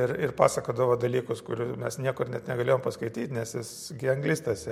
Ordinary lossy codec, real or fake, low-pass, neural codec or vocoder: MP3, 64 kbps; real; 19.8 kHz; none